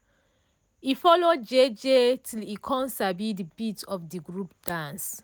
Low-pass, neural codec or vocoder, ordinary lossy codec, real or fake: none; none; none; real